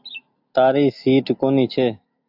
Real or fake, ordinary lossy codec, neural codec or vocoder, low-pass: real; AAC, 48 kbps; none; 5.4 kHz